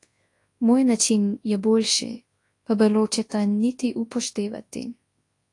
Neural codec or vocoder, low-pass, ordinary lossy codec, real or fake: codec, 24 kHz, 0.9 kbps, WavTokenizer, large speech release; 10.8 kHz; AAC, 48 kbps; fake